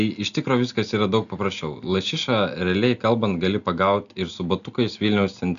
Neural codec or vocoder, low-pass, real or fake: none; 7.2 kHz; real